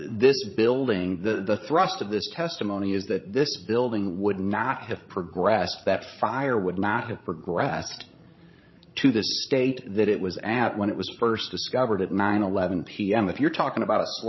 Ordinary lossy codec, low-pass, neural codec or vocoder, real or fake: MP3, 24 kbps; 7.2 kHz; codec, 16 kHz, 16 kbps, FreqCodec, larger model; fake